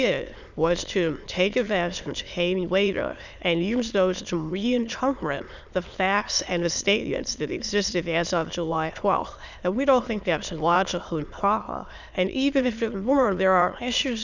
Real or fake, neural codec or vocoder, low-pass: fake; autoencoder, 22.05 kHz, a latent of 192 numbers a frame, VITS, trained on many speakers; 7.2 kHz